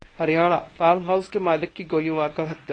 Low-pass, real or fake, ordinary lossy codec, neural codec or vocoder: 9.9 kHz; fake; AAC, 32 kbps; codec, 24 kHz, 0.9 kbps, WavTokenizer, medium speech release version 1